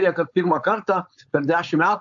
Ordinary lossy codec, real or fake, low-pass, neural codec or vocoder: AAC, 64 kbps; fake; 7.2 kHz; codec, 16 kHz, 4.8 kbps, FACodec